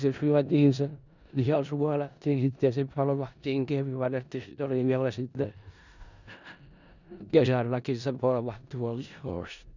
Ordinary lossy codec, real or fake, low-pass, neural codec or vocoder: none; fake; 7.2 kHz; codec, 16 kHz in and 24 kHz out, 0.4 kbps, LongCat-Audio-Codec, four codebook decoder